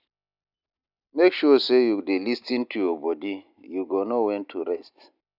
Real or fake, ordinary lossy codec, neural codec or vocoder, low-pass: real; none; none; 5.4 kHz